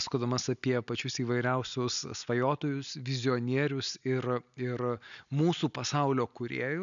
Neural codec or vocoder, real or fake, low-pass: none; real; 7.2 kHz